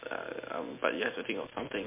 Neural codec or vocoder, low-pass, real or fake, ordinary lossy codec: none; 3.6 kHz; real; MP3, 24 kbps